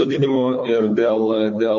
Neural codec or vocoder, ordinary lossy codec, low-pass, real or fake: codec, 16 kHz, 4 kbps, FunCodec, trained on Chinese and English, 50 frames a second; MP3, 48 kbps; 7.2 kHz; fake